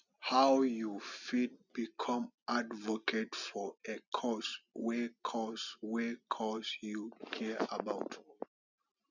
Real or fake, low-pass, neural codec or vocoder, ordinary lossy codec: real; 7.2 kHz; none; none